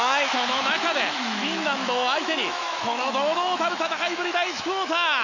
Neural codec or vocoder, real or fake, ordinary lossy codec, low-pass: autoencoder, 48 kHz, 128 numbers a frame, DAC-VAE, trained on Japanese speech; fake; none; 7.2 kHz